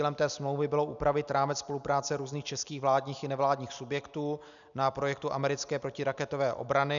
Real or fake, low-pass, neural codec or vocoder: real; 7.2 kHz; none